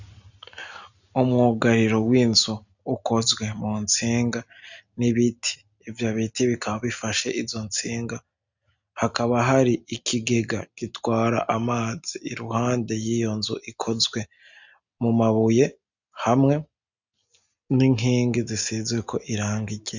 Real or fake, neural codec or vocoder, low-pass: real; none; 7.2 kHz